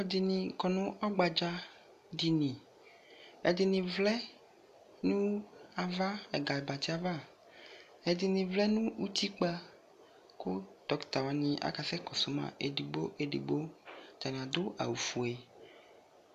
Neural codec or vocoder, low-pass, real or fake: none; 14.4 kHz; real